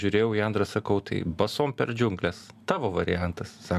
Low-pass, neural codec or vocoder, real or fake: 14.4 kHz; none; real